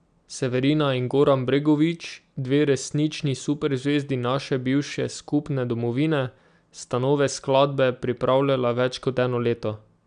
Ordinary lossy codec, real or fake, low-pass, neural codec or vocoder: none; real; 9.9 kHz; none